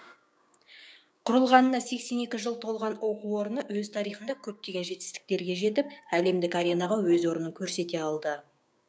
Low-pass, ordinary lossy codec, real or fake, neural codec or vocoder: none; none; fake; codec, 16 kHz, 6 kbps, DAC